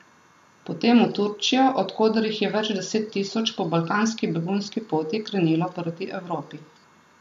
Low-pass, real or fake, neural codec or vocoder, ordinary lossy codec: 14.4 kHz; real; none; AAC, 96 kbps